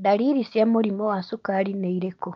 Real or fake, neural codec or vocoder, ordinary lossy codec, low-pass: real; none; Opus, 24 kbps; 7.2 kHz